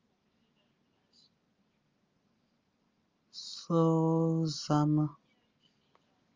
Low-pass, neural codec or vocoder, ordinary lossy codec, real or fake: 7.2 kHz; none; Opus, 32 kbps; real